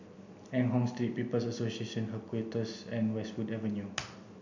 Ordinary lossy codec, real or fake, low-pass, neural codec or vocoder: none; real; 7.2 kHz; none